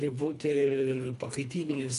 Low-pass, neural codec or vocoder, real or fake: 10.8 kHz; codec, 24 kHz, 1.5 kbps, HILCodec; fake